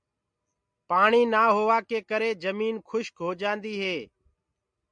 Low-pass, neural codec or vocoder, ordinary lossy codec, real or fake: 9.9 kHz; none; MP3, 64 kbps; real